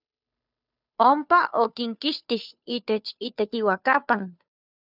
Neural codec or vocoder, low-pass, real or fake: codec, 16 kHz, 2 kbps, FunCodec, trained on Chinese and English, 25 frames a second; 5.4 kHz; fake